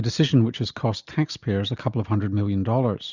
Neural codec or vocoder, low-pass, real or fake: none; 7.2 kHz; real